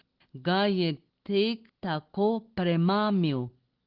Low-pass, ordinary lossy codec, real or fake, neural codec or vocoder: 5.4 kHz; Opus, 16 kbps; real; none